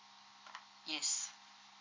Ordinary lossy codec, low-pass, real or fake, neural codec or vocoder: MP3, 48 kbps; 7.2 kHz; real; none